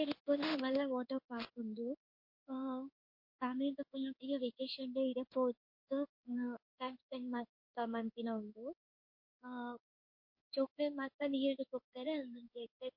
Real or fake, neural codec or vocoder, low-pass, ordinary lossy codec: fake; codec, 16 kHz in and 24 kHz out, 1 kbps, XY-Tokenizer; 5.4 kHz; MP3, 32 kbps